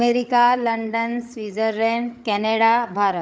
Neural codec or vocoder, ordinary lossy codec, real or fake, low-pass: codec, 16 kHz, 8 kbps, FreqCodec, larger model; none; fake; none